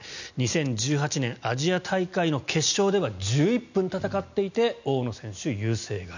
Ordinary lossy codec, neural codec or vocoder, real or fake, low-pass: none; none; real; 7.2 kHz